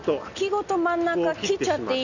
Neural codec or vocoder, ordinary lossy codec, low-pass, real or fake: none; none; 7.2 kHz; real